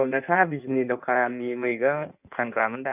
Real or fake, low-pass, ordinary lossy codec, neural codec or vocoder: fake; 3.6 kHz; none; codec, 16 kHz in and 24 kHz out, 1.1 kbps, FireRedTTS-2 codec